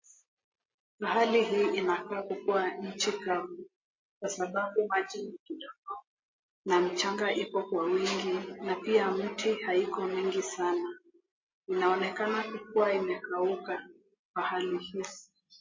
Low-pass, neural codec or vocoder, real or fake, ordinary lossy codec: 7.2 kHz; none; real; MP3, 32 kbps